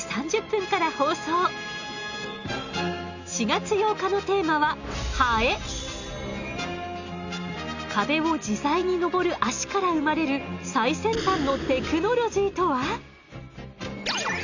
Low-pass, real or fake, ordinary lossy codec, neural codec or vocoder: 7.2 kHz; real; none; none